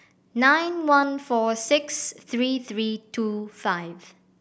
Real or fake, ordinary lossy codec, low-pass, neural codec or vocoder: real; none; none; none